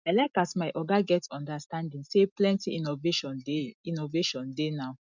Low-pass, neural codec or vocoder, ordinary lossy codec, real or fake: 7.2 kHz; none; none; real